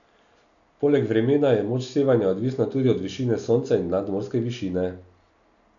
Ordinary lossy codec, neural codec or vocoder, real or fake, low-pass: none; none; real; 7.2 kHz